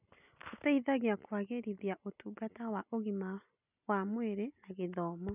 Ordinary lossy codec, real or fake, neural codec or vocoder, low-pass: none; real; none; 3.6 kHz